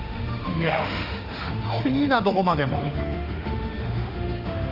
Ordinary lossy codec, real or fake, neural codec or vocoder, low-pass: Opus, 32 kbps; fake; autoencoder, 48 kHz, 32 numbers a frame, DAC-VAE, trained on Japanese speech; 5.4 kHz